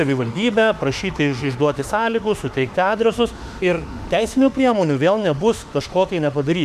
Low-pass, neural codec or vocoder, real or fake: 14.4 kHz; autoencoder, 48 kHz, 32 numbers a frame, DAC-VAE, trained on Japanese speech; fake